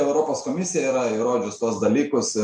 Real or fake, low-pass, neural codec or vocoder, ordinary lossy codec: real; 9.9 kHz; none; MP3, 48 kbps